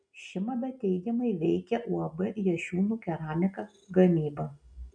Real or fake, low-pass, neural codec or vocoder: real; 9.9 kHz; none